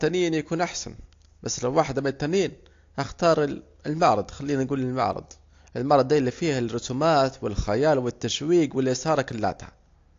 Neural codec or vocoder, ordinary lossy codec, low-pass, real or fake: none; AAC, 48 kbps; 7.2 kHz; real